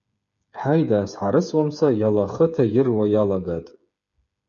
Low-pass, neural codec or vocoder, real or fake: 7.2 kHz; codec, 16 kHz, 8 kbps, FreqCodec, smaller model; fake